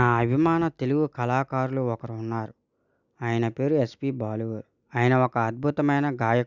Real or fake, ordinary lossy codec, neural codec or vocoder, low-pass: real; none; none; 7.2 kHz